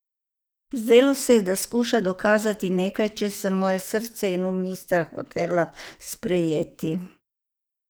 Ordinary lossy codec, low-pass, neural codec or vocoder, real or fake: none; none; codec, 44.1 kHz, 2.6 kbps, SNAC; fake